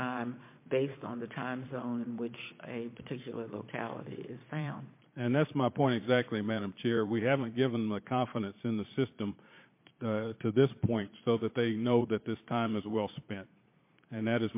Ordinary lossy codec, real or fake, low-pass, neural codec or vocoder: MP3, 24 kbps; fake; 3.6 kHz; vocoder, 22.05 kHz, 80 mel bands, Vocos